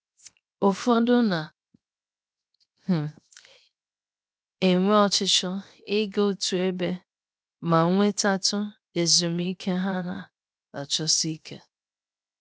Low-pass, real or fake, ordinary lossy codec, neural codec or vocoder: none; fake; none; codec, 16 kHz, 0.7 kbps, FocalCodec